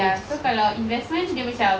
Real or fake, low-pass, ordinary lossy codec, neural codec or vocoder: real; none; none; none